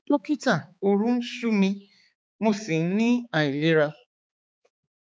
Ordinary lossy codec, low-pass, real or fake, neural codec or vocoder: none; none; fake; codec, 16 kHz, 4 kbps, X-Codec, HuBERT features, trained on balanced general audio